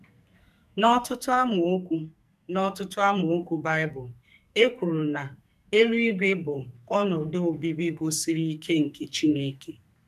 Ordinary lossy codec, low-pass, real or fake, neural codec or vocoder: none; 14.4 kHz; fake; codec, 44.1 kHz, 2.6 kbps, SNAC